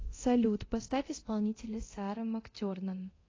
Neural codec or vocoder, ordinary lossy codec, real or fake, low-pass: codec, 24 kHz, 0.9 kbps, DualCodec; AAC, 32 kbps; fake; 7.2 kHz